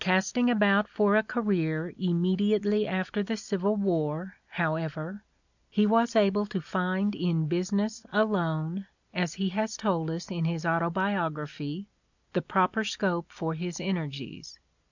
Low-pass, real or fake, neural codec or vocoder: 7.2 kHz; real; none